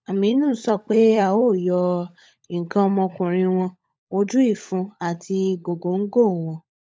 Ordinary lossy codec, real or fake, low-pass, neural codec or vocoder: none; fake; none; codec, 16 kHz, 16 kbps, FunCodec, trained on LibriTTS, 50 frames a second